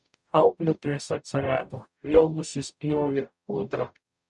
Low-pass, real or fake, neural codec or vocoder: 10.8 kHz; fake; codec, 44.1 kHz, 0.9 kbps, DAC